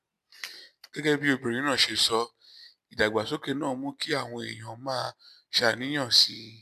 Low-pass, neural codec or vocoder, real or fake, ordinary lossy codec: 14.4 kHz; none; real; none